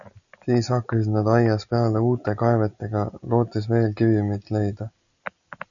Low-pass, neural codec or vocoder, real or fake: 7.2 kHz; none; real